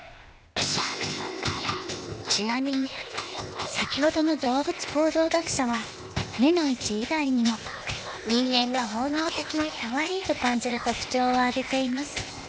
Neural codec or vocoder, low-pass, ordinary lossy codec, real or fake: codec, 16 kHz, 0.8 kbps, ZipCodec; none; none; fake